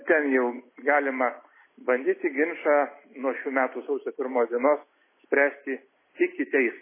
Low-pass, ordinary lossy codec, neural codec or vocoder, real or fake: 3.6 kHz; MP3, 16 kbps; none; real